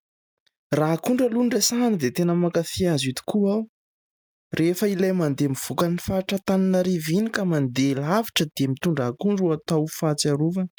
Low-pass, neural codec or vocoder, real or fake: 19.8 kHz; none; real